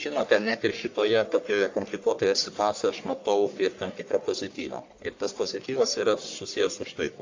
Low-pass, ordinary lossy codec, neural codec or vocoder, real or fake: 7.2 kHz; AAC, 48 kbps; codec, 44.1 kHz, 1.7 kbps, Pupu-Codec; fake